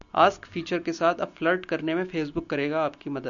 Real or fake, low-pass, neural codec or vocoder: real; 7.2 kHz; none